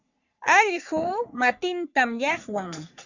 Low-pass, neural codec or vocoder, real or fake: 7.2 kHz; codec, 44.1 kHz, 3.4 kbps, Pupu-Codec; fake